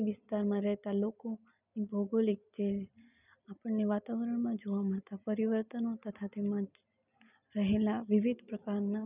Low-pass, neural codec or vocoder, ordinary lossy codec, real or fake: 3.6 kHz; none; none; real